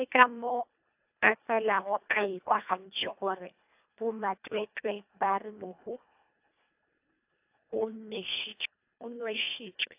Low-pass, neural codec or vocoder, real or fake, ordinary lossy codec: 3.6 kHz; codec, 24 kHz, 1.5 kbps, HILCodec; fake; AAC, 32 kbps